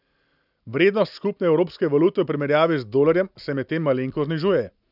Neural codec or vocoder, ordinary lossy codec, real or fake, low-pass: none; none; real; 5.4 kHz